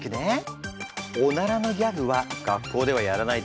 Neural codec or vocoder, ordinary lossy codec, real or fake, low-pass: none; none; real; none